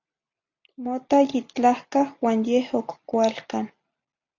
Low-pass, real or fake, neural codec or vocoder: 7.2 kHz; real; none